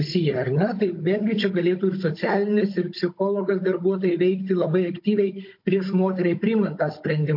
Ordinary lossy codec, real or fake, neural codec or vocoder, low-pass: MP3, 32 kbps; fake; codec, 16 kHz, 16 kbps, FunCodec, trained on Chinese and English, 50 frames a second; 5.4 kHz